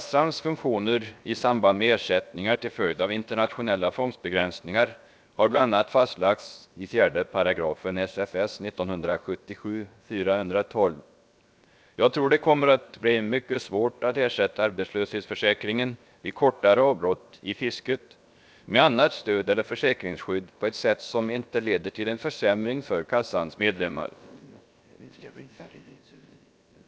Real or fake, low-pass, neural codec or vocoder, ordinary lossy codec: fake; none; codec, 16 kHz, 0.7 kbps, FocalCodec; none